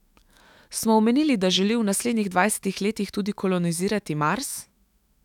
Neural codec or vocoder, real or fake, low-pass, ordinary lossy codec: autoencoder, 48 kHz, 128 numbers a frame, DAC-VAE, trained on Japanese speech; fake; 19.8 kHz; none